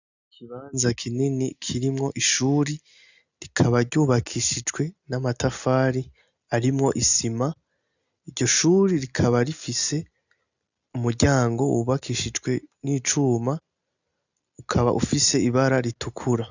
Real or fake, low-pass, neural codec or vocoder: real; 7.2 kHz; none